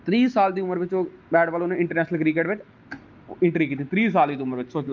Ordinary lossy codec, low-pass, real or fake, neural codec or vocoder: Opus, 32 kbps; 7.2 kHz; real; none